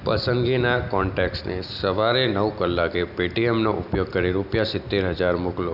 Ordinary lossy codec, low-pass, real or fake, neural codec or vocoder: none; 5.4 kHz; real; none